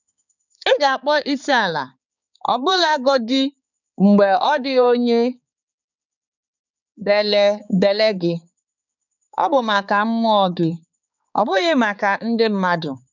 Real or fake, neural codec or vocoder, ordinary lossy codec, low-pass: fake; codec, 16 kHz, 4 kbps, X-Codec, HuBERT features, trained on balanced general audio; none; 7.2 kHz